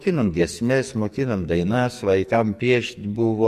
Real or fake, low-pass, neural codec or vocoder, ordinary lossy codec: fake; 14.4 kHz; codec, 44.1 kHz, 2.6 kbps, SNAC; MP3, 64 kbps